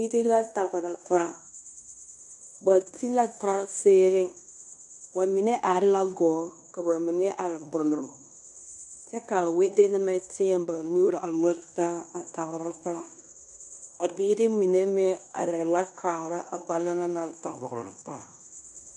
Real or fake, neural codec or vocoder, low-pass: fake; codec, 16 kHz in and 24 kHz out, 0.9 kbps, LongCat-Audio-Codec, fine tuned four codebook decoder; 10.8 kHz